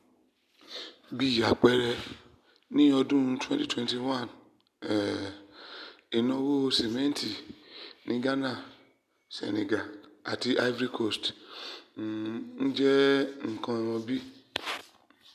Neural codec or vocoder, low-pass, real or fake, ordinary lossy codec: none; 14.4 kHz; real; none